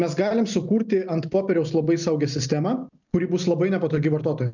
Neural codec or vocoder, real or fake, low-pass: none; real; 7.2 kHz